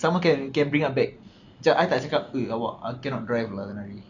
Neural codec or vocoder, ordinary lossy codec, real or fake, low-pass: none; none; real; 7.2 kHz